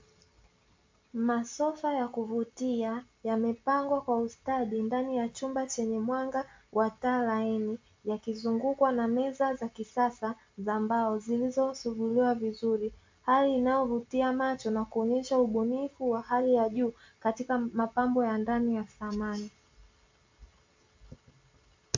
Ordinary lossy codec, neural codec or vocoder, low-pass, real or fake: MP3, 48 kbps; none; 7.2 kHz; real